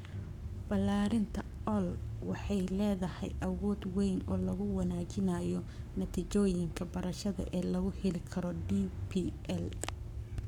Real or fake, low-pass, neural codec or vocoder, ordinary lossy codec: fake; 19.8 kHz; codec, 44.1 kHz, 7.8 kbps, Pupu-Codec; none